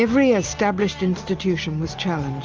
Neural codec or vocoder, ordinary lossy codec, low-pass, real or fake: none; Opus, 24 kbps; 7.2 kHz; real